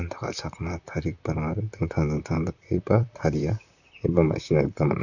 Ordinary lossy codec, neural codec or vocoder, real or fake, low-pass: none; vocoder, 44.1 kHz, 128 mel bands, Pupu-Vocoder; fake; 7.2 kHz